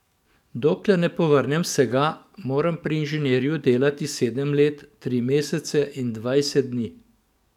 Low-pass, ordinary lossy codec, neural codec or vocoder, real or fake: 19.8 kHz; none; codec, 44.1 kHz, 7.8 kbps, DAC; fake